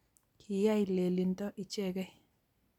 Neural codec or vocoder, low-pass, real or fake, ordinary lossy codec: none; 19.8 kHz; real; none